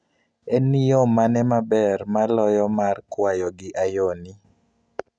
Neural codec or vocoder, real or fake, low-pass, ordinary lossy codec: none; real; 9.9 kHz; none